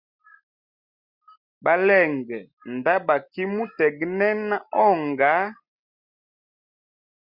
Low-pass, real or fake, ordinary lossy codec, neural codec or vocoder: 5.4 kHz; real; Opus, 64 kbps; none